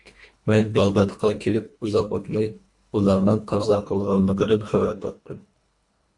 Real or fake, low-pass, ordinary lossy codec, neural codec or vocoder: fake; 10.8 kHz; AAC, 64 kbps; codec, 24 kHz, 1.5 kbps, HILCodec